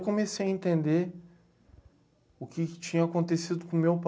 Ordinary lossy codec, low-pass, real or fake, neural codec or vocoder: none; none; real; none